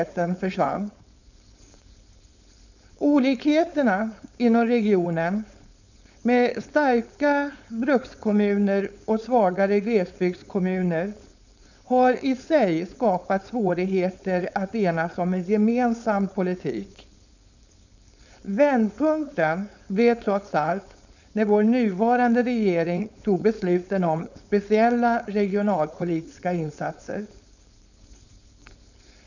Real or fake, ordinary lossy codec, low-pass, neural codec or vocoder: fake; none; 7.2 kHz; codec, 16 kHz, 4.8 kbps, FACodec